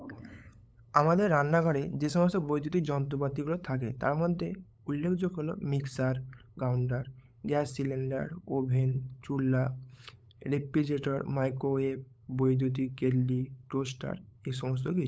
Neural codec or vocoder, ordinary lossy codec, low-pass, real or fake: codec, 16 kHz, 8 kbps, FunCodec, trained on LibriTTS, 25 frames a second; none; none; fake